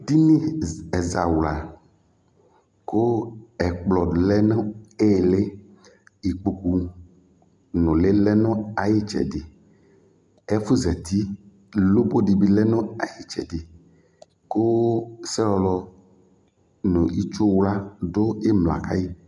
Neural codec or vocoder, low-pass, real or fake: none; 10.8 kHz; real